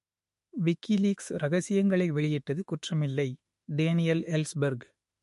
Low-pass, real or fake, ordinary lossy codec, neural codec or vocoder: 14.4 kHz; fake; MP3, 48 kbps; autoencoder, 48 kHz, 32 numbers a frame, DAC-VAE, trained on Japanese speech